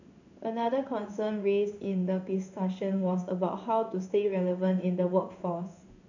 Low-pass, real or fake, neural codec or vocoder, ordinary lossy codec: 7.2 kHz; fake; codec, 16 kHz in and 24 kHz out, 1 kbps, XY-Tokenizer; none